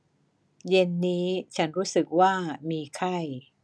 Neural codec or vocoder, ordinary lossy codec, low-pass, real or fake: none; none; none; real